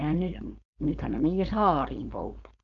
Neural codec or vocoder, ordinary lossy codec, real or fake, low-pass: codec, 16 kHz, 4.8 kbps, FACodec; none; fake; 7.2 kHz